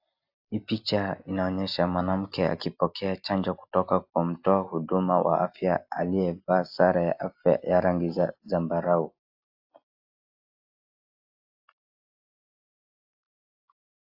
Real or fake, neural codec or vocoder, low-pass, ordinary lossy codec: real; none; 5.4 kHz; AAC, 48 kbps